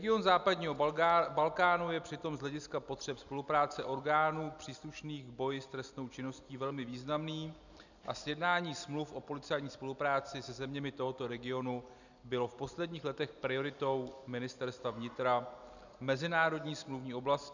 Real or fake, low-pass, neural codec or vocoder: real; 7.2 kHz; none